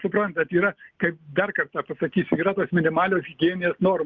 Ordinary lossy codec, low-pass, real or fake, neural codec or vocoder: Opus, 24 kbps; 7.2 kHz; real; none